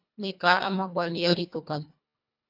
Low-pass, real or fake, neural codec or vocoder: 5.4 kHz; fake; codec, 24 kHz, 1.5 kbps, HILCodec